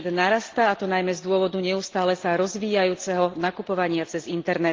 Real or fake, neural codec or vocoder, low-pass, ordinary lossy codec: real; none; 7.2 kHz; Opus, 16 kbps